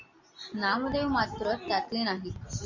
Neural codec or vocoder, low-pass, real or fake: none; 7.2 kHz; real